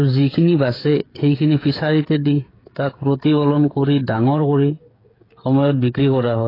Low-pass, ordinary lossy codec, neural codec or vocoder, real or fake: 5.4 kHz; AAC, 24 kbps; codec, 16 kHz, 8 kbps, FreqCodec, smaller model; fake